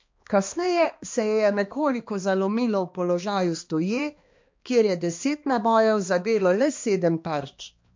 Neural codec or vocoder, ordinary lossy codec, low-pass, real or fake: codec, 16 kHz, 2 kbps, X-Codec, HuBERT features, trained on balanced general audio; MP3, 48 kbps; 7.2 kHz; fake